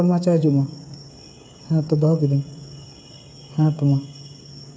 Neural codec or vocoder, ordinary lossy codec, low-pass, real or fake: codec, 16 kHz, 8 kbps, FreqCodec, smaller model; none; none; fake